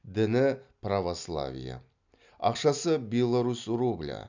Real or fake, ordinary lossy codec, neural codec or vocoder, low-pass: real; none; none; 7.2 kHz